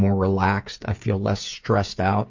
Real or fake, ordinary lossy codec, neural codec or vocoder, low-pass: fake; MP3, 48 kbps; codec, 16 kHz, 8 kbps, FreqCodec, smaller model; 7.2 kHz